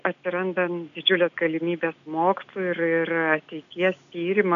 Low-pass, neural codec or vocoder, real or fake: 7.2 kHz; none; real